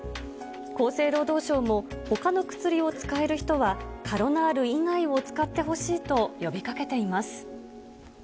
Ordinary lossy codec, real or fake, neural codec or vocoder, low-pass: none; real; none; none